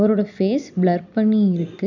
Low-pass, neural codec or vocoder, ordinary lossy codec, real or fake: 7.2 kHz; none; none; real